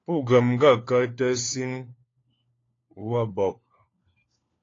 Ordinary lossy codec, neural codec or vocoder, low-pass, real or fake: AAC, 32 kbps; codec, 16 kHz, 4 kbps, FunCodec, trained on LibriTTS, 50 frames a second; 7.2 kHz; fake